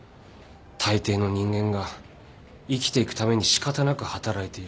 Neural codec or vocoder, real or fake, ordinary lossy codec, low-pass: none; real; none; none